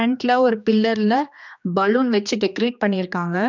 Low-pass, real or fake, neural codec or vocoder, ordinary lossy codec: 7.2 kHz; fake; codec, 16 kHz, 2 kbps, X-Codec, HuBERT features, trained on general audio; none